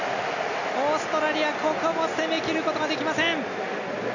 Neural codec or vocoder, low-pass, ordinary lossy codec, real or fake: none; 7.2 kHz; none; real